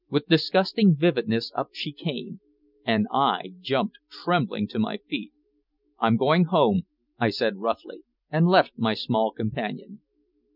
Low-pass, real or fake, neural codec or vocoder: 5.4 kHz; real; none